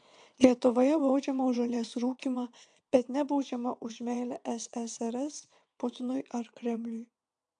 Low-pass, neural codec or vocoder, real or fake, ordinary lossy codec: 9.9 kHz; vocoder, 22.05 kHz, 80 mel bands, WaveNeXt; fake; AAC, 64 kbps